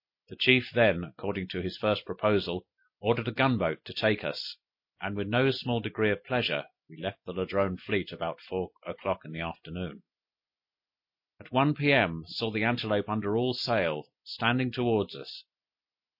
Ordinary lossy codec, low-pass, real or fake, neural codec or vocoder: MP3, 32 kbps; 5.4 kHz; real; none